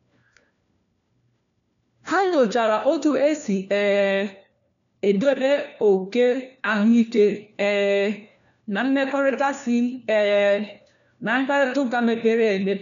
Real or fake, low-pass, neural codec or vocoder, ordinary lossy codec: fake; 7.2 kHz; codec, 16 kHz, 1 kbps, FunCodec, trained on LibriTTS, 50 frames a second; none